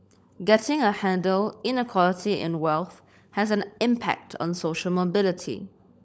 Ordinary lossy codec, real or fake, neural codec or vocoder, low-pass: none; fake; codec, 16 kHz, 16 kbps, FunCodec, trained on LibriTTS, 50 frames a second; none